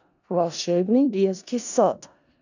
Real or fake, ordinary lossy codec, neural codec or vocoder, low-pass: fake; none; codec, 16 kHz in and 24 kHz out, 0.4 kbps, LongCat-Audio-Codec, four codebook decoder; 7.2 kHz